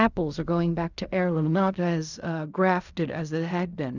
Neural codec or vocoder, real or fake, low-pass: codec, 16 kHz in and 24 kHz out, 0.4 kbps, LongCat-Audio-Codec, fine tuned four codebook decoder; fake; 7.2 kHz